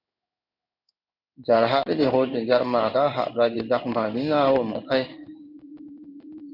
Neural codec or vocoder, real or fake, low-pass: codec, 16 kHz in and 24 kHz out, 1 kbps, XY-Tokenizer; fake; 5.4 kHz